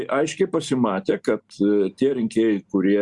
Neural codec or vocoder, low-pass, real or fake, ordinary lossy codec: none; 10.8 kHz; real; Opus, 64 kbps